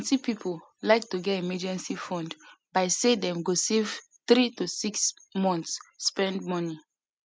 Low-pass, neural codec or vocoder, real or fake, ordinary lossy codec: none; none; real; none